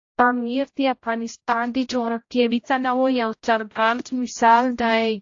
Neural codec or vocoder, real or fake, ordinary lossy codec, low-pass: codec, 16 kHz, 0.5 kbps, X-Codec, HuBERT features, trained on general audio; fake; AAC, 48 kbps; 7.2 kHz